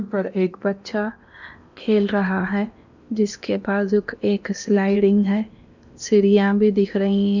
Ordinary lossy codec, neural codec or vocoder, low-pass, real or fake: none; codec, 16 kHz in and 24 kHz out, 0.8 kbps, FocalCodec, streaming, 65536 codes; 7.2 kHz; fake